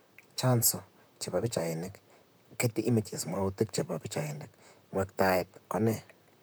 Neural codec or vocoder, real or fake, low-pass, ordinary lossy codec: vocoder, 44.1 kHz, 128 mel bands, Pupu-Vocoder; fake; none; none